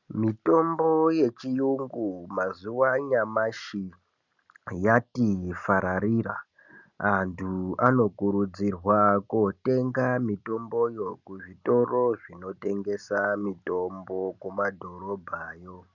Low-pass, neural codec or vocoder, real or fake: 7.2 kHz; none; real